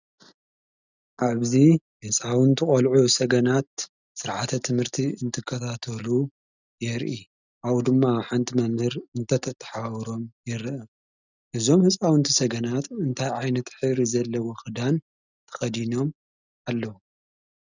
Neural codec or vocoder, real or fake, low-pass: none; real; 7.2 kHz